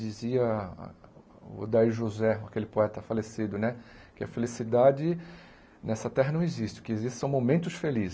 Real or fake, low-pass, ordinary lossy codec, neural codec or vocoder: real; none; none; none